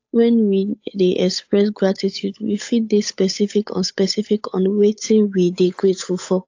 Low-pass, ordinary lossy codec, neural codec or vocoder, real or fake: 7.2 kHz; AAC, 48 kbps; codec, 16 kHz, 8 kbps, FunCodec, trained on Chinese and English, 25 frames a second; fake